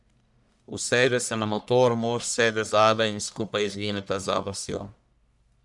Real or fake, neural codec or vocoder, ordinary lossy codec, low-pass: fake; codec, 44.1 kHz, 1.7 kbps, Pupu-Codec; none; 10.8 kHz